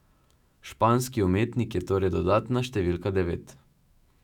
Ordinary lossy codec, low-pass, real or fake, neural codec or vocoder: none; 19.8 kHz; fake; autoencoder, 48 kHz, 128 numbers a frame, DAC-VAE, trained on Japanese speech